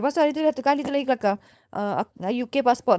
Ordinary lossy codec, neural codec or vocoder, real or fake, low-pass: none; codec, 16 kHz, 4.8 kbps, FACodec; fake; none